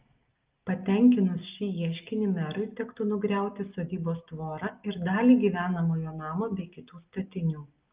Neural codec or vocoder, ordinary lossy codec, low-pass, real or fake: none; Opus, 32 kbps; 3.6 kHz; real